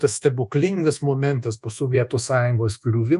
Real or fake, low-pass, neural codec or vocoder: fake; 10.8 kHz; codec, 24 kHz, 1.2 kbps, DualCodec